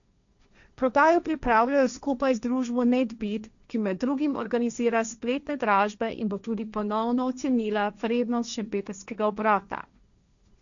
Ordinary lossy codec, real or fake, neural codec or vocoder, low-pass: none; fake; codec, 16 kHz, 1.1 kbps, Voila-Tokenizer; 7.2 kHz